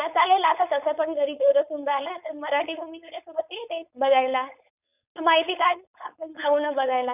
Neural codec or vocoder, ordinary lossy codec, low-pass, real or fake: codec, 16 kHz, 4.8 kbps, FACodec; none; 3.6 kHz; fake